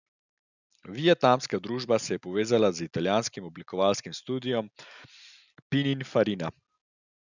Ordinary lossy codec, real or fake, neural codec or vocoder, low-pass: none; real; none; 7.2 kHz